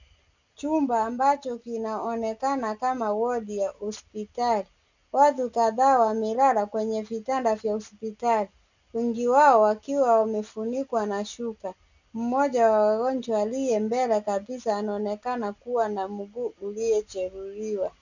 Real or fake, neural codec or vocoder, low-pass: real; none; 7.2 kHz